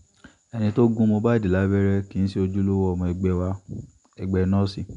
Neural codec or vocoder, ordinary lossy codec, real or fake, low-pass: none; none; real; 10.8 kHz